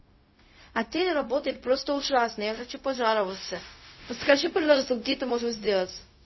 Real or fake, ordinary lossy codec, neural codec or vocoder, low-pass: fake; MP3, 24 kbps; codec, 16 kHz, 0.4 kbps, LongCat-Audio-Codec; 7.2 kHz